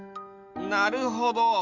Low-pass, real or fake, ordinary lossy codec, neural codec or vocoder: 7.2 kHz; real; Opus, 64 kbps; none